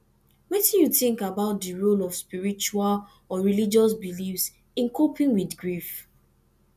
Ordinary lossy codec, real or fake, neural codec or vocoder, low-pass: none; real; none; 14.4 kHz